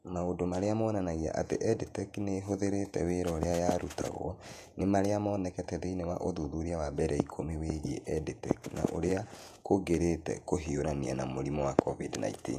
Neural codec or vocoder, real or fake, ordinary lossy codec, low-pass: vocoder, 48 kHz, 128 mel bands, Vocos; fake; none; 14.4 kHz